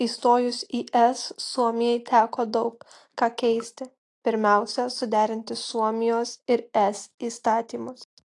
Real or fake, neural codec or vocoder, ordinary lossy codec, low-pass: real; none; AAC, 48 kbps; 10.8 kHz